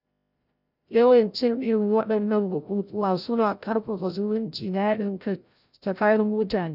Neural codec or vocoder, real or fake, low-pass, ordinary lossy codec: codec, 16 kHz, 0.5 kbps, FreqCodec, larger model; fake; 5.4 kHz; none